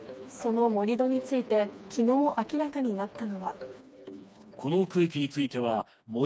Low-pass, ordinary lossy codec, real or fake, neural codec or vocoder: none; none; fake; codec, 16 kHz, 2 kbps, FreqCodec, smaller model